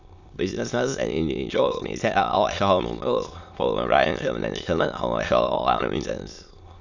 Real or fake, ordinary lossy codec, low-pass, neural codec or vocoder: fake; none; 7.2 kHz; autoencoder, 22.05 kHz, a latent of 192 numbers a frame, VITS, trained on many speakers